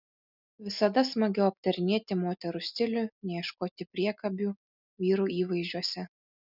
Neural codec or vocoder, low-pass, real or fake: none; 5.4 kHz; real